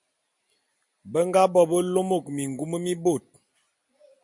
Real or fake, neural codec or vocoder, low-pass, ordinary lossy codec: real; none; 10.8 kHz; MP3, 64 kbps